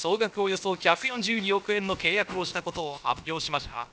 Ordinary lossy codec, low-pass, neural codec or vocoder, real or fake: none; none; codec, 16 kHz, about 1 kbps, DyCAST, with the encoder's durations; fake